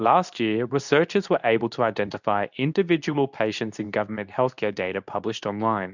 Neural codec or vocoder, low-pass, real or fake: codec, 24 kHz, 0.9 kbps, WavTokenizer, medium speech release version 2; 7.2 kHz; fake